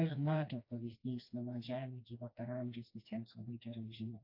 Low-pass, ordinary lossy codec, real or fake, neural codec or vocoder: 5.4 kHz; AAC, 32 kbps; fake; codec, 16 kHz, 2 kbps, FreqCodec, smaller model